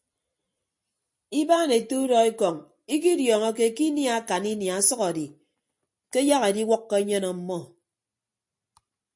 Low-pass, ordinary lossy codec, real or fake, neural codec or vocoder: 10.8 kHz; MP3, 48 kbps; real; none